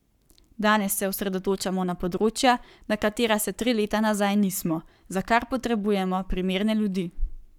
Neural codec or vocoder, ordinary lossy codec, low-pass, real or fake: codec, 44.1 kHz, 7.8 kbps, Pupu-Codec; none; 19.8 kHz; fake